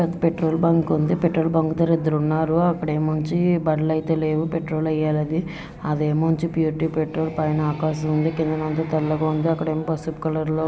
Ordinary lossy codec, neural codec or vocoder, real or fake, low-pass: none; none; real; none